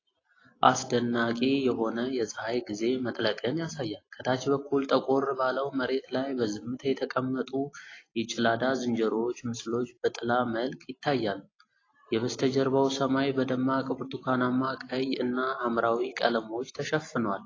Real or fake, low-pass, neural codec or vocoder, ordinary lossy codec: real; 7.2 kHz; none; AAC, 32 kbps